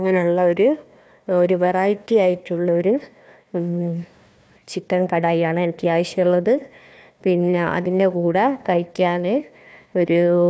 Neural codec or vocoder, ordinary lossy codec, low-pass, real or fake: codec, 16 kHz, 1 kbps, FunCodec, trained on Chinese and English, 50 frames a second; none; none; fake